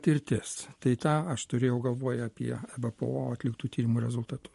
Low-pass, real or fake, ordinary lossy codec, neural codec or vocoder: 14.4 kHz; real; MP3, 48 kbps; none